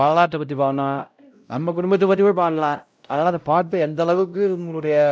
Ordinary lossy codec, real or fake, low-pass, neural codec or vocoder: none; fake; none; codec, 16 kHz, 0.5 kbps, X-Codec, WavLM features, trained on Multilingual LibriSpeech